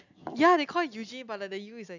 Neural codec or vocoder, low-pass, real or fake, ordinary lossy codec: none; 7.2 kHz; real; none